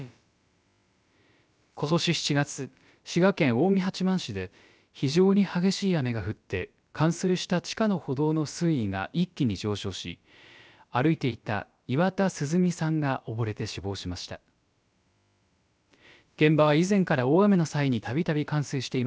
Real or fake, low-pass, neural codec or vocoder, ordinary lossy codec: fake; none; codec, 16 kHz, about 1 kbps, DyCAST, with the encoder's durations; none